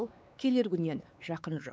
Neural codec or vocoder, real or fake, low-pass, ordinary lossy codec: codec, 16 kHz, 4 kbps, X-Codec, WavLM features, trained on Multilingual LibriSpeech; fake; none; none